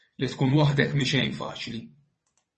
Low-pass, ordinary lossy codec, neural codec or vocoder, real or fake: 9.9 kHz; MP3, 32 kbps; vocoder, 22.05 kHz, 80 mel bands, WaveNeXt; fake